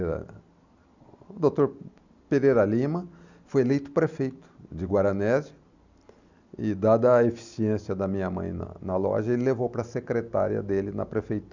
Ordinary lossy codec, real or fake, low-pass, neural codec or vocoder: none; real; 7.2 kHz; none